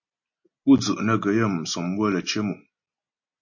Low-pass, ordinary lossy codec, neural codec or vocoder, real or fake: 7.2 kHz; MP3, 32 kbps; none; real